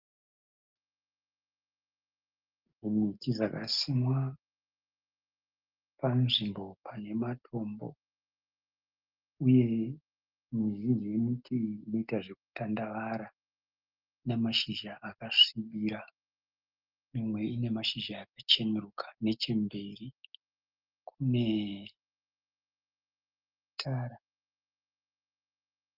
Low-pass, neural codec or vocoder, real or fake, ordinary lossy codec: 5.4 kHz; none; real; Opus, 24 kbps